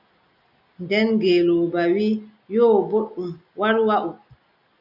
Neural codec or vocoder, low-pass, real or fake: none; 5.4 kHz; real